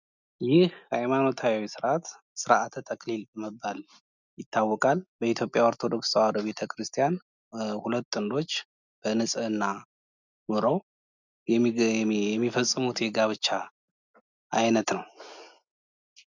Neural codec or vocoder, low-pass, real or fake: none; 7.2 kHz; real